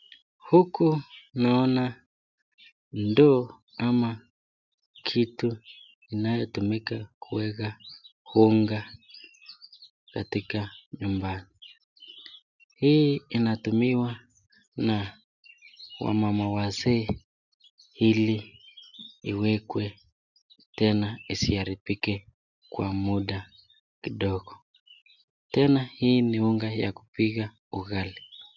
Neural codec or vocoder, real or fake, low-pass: none; real; 7.2 kHz